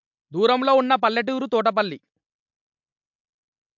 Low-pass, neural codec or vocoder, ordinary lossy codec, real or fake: 7.2 kHz; none; MP3, 64 kbps; real